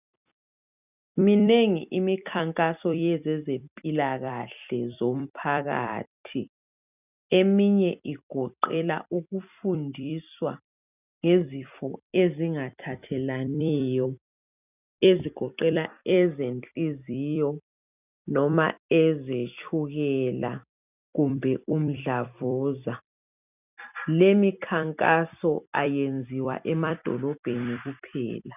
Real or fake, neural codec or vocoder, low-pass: fake; vocoder, 44.1 kHz, 128 mel bands every 256 samples, BigVGAN v2; 3.6 kHz